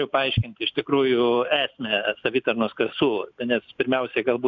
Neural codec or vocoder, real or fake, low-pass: none; real; 7.2 kHz